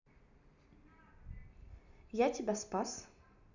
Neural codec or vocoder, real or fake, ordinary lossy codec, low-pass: none; real; none; 7.2 kHz